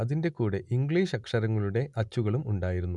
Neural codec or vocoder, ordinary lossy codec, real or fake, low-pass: none; none; real; 10.8 kHz